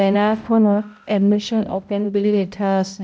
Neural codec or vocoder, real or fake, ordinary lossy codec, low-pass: codec, 16 kHz, 0.5 kbps, X-Codec, HuBERT features, trained on balanced general audio; fake; none; none